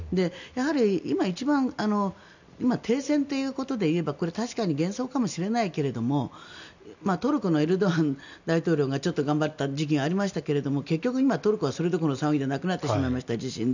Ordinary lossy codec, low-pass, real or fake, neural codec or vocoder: none; 7.2 kHz; real; none